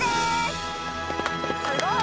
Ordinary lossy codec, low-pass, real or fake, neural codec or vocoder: none; none; real; none